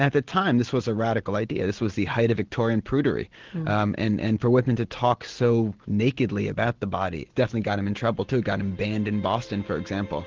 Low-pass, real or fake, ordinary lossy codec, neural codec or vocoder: 7.2 kHz; real; Opus, 16 kbps; none